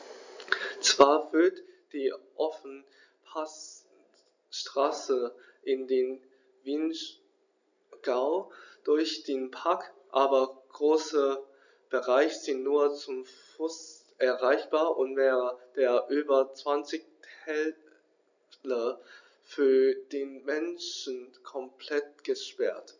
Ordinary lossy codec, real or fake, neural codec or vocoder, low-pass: none; real; none; 7.2 kHz